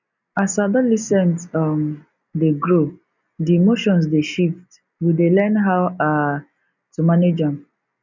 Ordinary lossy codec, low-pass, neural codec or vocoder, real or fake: none; 7.2 kHz; none; real